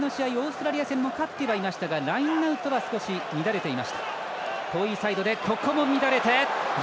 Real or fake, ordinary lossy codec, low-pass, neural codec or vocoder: real; none; none; none